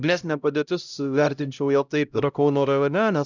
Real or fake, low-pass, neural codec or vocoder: fake; 7.2 kHz; codec, 16 kHz, 0.5 kbps, X-Codec, HuBERT features, trained on LibriSpeech